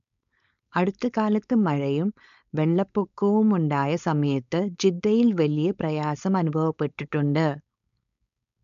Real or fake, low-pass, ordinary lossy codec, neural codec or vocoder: fake; 7.2 kHz; MP3, 64 kbps; codec, 16 kHz, 4.8 kbps, FACodec